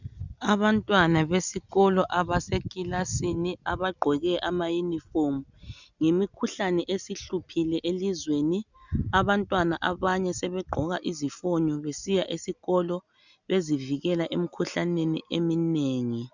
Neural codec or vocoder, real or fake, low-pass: none; real; 7.2 kHz